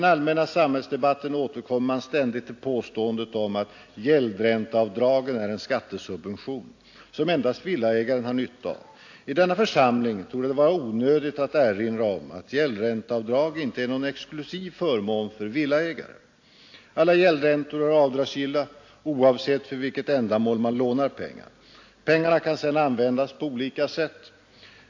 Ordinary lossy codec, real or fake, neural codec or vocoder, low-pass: AAC, 48 kbps; real; none; 7.2 kHz